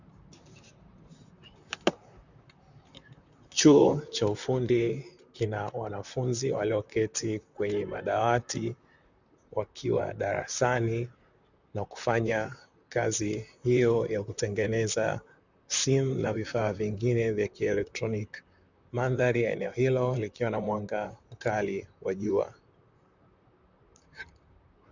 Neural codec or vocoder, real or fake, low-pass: vocoder, 44.1 kHz, 128 mel bands, Pupu-Vocoder; fake; 7.2 kHz